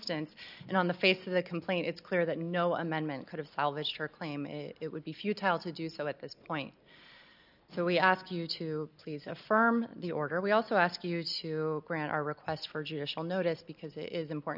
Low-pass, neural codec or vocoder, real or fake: 5.4 kHz; none; real